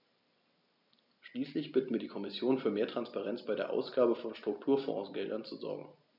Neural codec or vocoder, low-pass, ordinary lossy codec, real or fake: none; 5.4 kHz; none; real